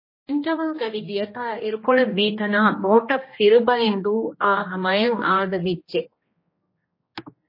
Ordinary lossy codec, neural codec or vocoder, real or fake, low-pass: MP3, 24 kbps; codec, 16 kHz, 1 kbps, X-Codec, HuBERT features, trained on general audio; fake; 5.4 kHz